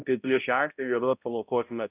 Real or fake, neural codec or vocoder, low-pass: fake; codec, 16 kHz, 0.5 kbps, X-Codec, HuBERT features, trained on balanced general audio; 3.6 kHz